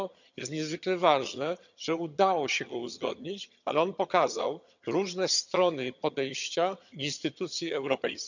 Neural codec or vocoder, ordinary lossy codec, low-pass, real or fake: vocoder, 22.05 kHz, 80 mel bands, HiFi-GAN; none; 7.2 kHz; fake